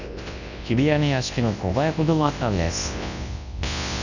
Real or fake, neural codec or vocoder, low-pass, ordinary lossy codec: fake; codec, 24 kHz, 0.9 kbps, WavTokenizer, large speech release; 7.2 kHz; none